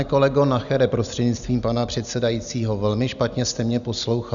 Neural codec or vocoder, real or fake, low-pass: none; real; 7.2 kHz